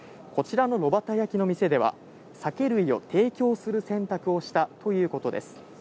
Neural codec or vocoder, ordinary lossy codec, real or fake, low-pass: none; none; real; none